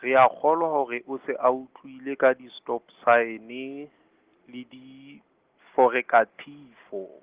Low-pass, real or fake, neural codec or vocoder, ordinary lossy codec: 3.6 kHz; real; none; Opus, 16 kbps